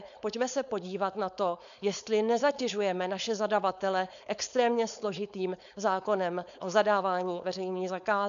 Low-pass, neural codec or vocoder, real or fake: 7.2 kHz; codec, 16 kHz, 4.8 kbps, FACodec; fake